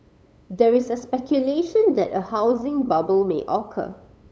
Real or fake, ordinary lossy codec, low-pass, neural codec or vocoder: fake; none; none; codec, 16 kHz, 8 kbps, FunCodec, trained on LibriTTS, 25 frames a second